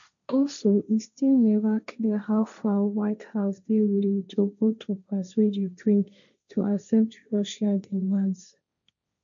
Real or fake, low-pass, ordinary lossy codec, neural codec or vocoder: fake; 7.2 kHz; none; codec, 16 kHz, 1.1 kbps, Voila-Tokenizer